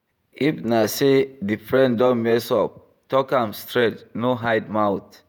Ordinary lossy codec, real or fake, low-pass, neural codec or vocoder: none; fake; none; vocoder, 48 kHz, 128 mel bands, Vocos